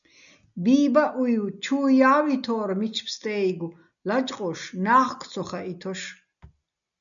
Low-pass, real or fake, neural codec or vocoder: 7.2 kHz; real; none